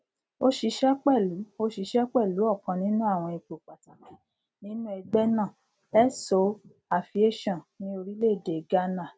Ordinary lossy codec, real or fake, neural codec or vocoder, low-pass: none; real; none; none